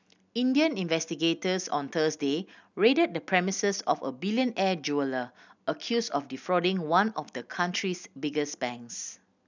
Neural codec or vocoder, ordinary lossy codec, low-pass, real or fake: none; none; 7.2 kHz; real